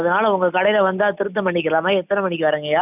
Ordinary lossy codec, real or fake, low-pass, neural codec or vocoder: none; real; 3.6 kHz; none